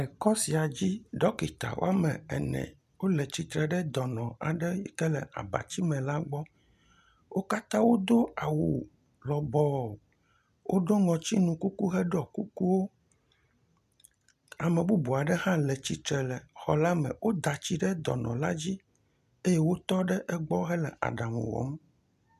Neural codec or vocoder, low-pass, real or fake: none; 14.4 kHz; real